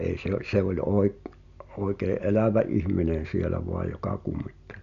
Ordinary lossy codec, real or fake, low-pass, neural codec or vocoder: none; real; 7.2 kHz; none